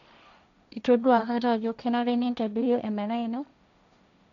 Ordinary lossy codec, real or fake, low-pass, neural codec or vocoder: none; fake; 7.2 kHz; codec, 16 kHz, 1.1 kbps, Voila-Tokenizer